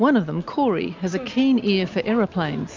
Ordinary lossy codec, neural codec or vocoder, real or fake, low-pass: MP3, 64 kbps; none; real; 7.2 kHz